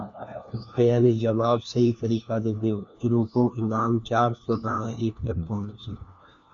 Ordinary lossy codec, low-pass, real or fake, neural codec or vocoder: Opus, 64 kbps; 7.2 kHz; fake; codec, 16 kHz, 1 kbps, FunCodec, trained on LibriTTS, 50 frames a second